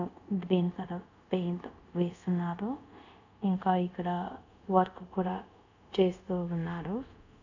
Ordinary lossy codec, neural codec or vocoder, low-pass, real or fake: none; codec, 24 kHz, 0.5 kbps, DualCodec; 7.2 kHz; fake